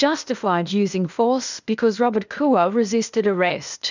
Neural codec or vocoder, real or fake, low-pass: codec, 16 kHz, 0.8 kbps, ZipCodec; fake; 7.2 kHz